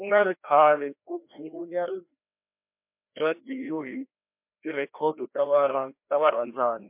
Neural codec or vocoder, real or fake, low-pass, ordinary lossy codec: codec, 16 kHz, 1 kbps, FreqCodec, larger model; fake; 3.6 kHz; MP3, 32 kbps